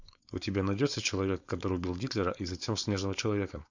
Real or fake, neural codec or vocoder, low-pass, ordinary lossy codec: fake; codec, 16 kHz, 4.8 kbps, FACodec; 7.2 kHz; MP3, 64 kbps